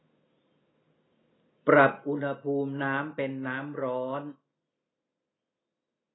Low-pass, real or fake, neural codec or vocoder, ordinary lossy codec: 7.2 kHz; real; none; AAC, 16 kbps